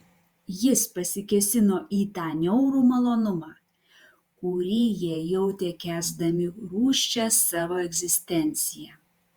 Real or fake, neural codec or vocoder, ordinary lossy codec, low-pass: fake; vocoder, 48 kHz, 128 mel bands, Vocos; Opus, 64 kbps; 19.8 kHz